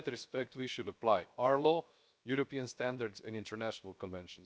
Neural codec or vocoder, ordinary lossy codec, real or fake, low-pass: codec, 16 kHz, 0.7 kbps, FocalCodec; none; fake; none